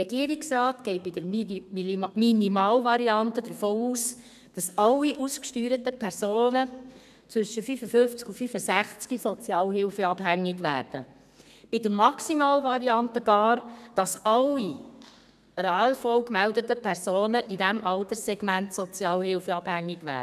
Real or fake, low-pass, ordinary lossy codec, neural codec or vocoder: fake; 14.4 kHz; none; codec, 32 kHz, 1.9 kbps, SNAC